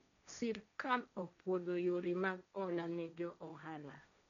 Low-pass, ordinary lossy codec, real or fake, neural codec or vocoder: 7.2 kHz; none; fake; codec, 16 kHz, 1.1 kbps, Voila-Tokenizer